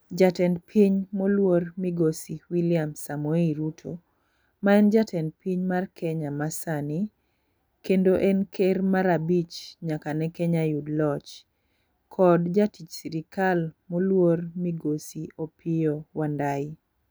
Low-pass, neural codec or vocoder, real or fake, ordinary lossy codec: none; none; real; none